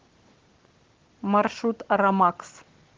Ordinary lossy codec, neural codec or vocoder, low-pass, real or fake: Opus, 16 kbps; none; 7.2 kHz; real